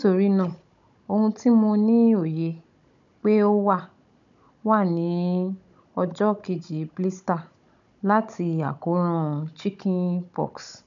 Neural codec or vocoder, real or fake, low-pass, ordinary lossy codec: codec, 16 kHz, 16 kbps, FunCodec, trained on Chinese and English, 50 frames a second; fake; 7.2 kHz; none